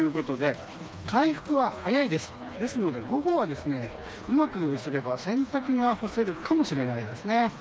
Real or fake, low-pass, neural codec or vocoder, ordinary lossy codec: fake; none; codec, 16 kHz, 2 kbps, FreqCodec, smaller model; none